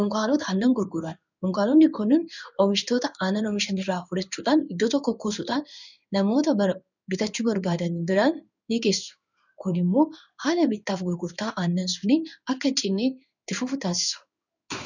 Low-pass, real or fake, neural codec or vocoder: 7.2 kHz; fake; codec, 16 kHz in and 24 kHz out, 1 kbps, XY-Tokenizer